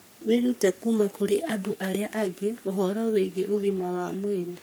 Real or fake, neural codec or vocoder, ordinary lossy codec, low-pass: fake; codec, 44.1 kHz, 3.4 kbps, Pupu-Codec; none; none